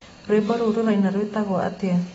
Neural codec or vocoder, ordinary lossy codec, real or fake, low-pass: none; AAC, 24 kbps; real; 19.8 kHz